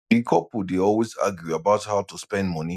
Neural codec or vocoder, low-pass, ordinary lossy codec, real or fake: none; 14.4 kHz; none; real